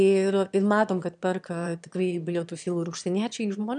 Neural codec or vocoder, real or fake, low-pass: autoencoder, 22.05 kHz, a latent of 192 numbers a frame, VITS, trained on one speaker; fake; 9.9 kHz